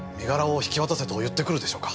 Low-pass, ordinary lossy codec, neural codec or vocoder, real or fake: none; none; none; real